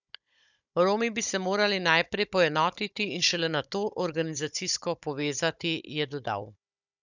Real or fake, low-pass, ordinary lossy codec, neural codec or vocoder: fake; 7.2 kHz; none; codec, 16 kHz, 16 kbps, FunCodec, trained on Chinese and English, 50 frames a second